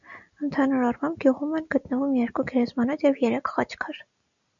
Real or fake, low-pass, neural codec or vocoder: real; 7.2 kHz; none